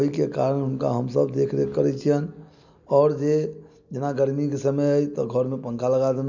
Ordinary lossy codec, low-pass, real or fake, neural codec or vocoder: none; 7.2 kHz; real; none